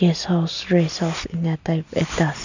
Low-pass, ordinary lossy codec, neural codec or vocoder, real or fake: 7.2 kHz; none; none; real